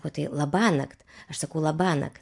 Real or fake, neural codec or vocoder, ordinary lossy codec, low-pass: real; none; MP3, 64 kbps; 10.8 kHz